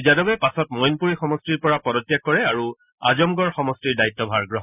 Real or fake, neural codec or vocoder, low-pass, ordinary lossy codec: real; none; 3.6 kHz; none